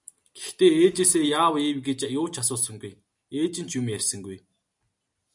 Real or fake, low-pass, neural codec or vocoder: real; 10.8 kHz; none